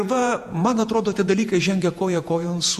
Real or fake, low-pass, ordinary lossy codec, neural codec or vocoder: fake; 14.4 kHz; MP3, 96 kbps; vocoder, 48 kHz, 128 mel bands, Vocos